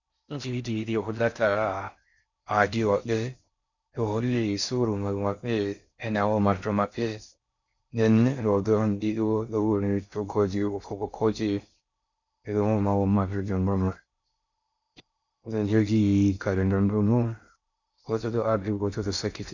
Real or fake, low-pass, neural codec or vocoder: fake; 7.2 kHz; codec, 16 kHz in and 24 kHz out, 0.6 kbps, FocalCodec, streaming, 4096 codes